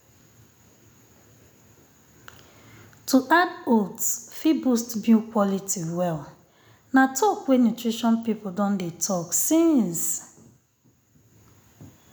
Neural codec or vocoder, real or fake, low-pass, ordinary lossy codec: none; real; none; none